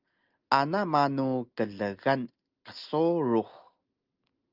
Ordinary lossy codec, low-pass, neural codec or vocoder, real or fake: Opus, 24 kbps; 5.4 kHz; none; real